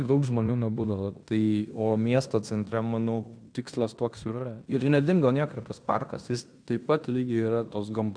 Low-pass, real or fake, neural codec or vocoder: 9.9 kHz; fake; codec, 16 kHz in and 24 kHz out, 0.9 kbps, LongCat-Audio-Codec, fine tuned four codebook decoder